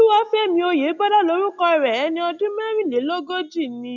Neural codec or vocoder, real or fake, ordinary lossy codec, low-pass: none; real; none; 7.2 kHz